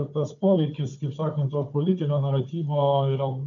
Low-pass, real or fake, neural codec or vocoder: 7.2 kHz; fake; codec, 16 kHz, 4 kbps, FunCodec, trained on Chinese and English, 50 frames a second